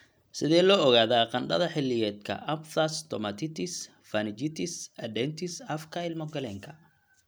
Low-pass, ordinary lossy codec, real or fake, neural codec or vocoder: none; none; real; none